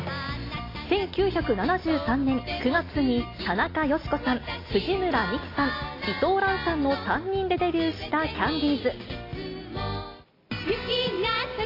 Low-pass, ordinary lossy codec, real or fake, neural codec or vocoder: 5.4 kHz; AAC, 24 kbps; real; none